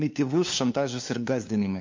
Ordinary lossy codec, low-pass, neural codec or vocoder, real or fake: MP3, 48 kbps; 7.2 kHz; codec, 16 kHz, 2 kbps, FunCodec, trained on LibriTTS, 25 frames a second; fake